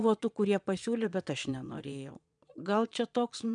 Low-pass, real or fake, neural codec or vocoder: 9.9 kHz; fake; vocoder, 22.05 kHz, 80 mel bands, Vocos